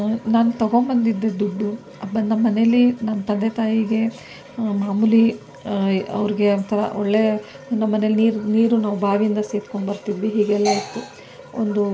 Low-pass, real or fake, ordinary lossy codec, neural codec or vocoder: none; real; none; none